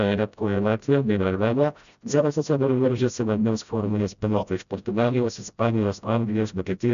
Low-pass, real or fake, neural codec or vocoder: 7.2 kHz; fake; codec, 16 kHz, 0.5 kbps, FreqCodec, smaller model